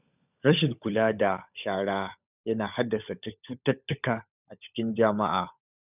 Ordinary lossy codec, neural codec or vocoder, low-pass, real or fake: none; codec, 16 kHz, 16 kbps, FunCodec, trained on LibriTTS, 50 frames a second; 3.6 kHz; fake